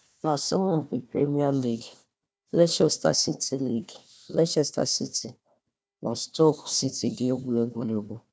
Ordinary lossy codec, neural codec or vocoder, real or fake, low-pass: none; codec, 16 kHz, 1 kbps, FunCodec, trained on Chinese and English, 50 frames a second; fake; none